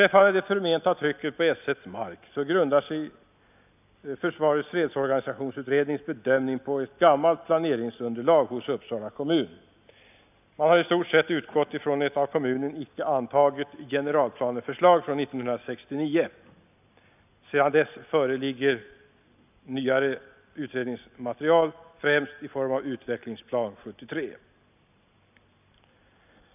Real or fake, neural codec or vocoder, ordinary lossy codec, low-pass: real; none; none; 3.6 kHz